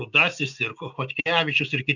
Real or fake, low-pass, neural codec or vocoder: real; 7.2 kHz; none